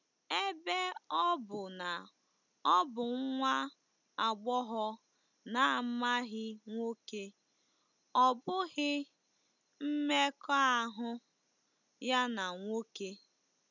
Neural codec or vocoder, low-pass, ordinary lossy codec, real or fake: none; 7.2 kHz; none; real